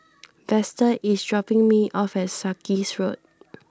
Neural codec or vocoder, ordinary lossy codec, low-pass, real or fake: none; none; none; real